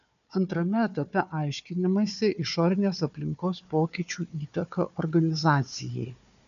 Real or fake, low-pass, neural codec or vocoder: fake; 7.2 kHz; codec, 16 kHz, 4 kbps, FunCodec, trained on Chinese and English, 50 frames a second